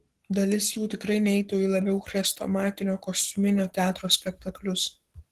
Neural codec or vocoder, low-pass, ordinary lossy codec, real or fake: codec, 44.1 kHz, 7.8 kbps, Pupu-Codec; 14.4 kHz; Opus, 16 kbps; fake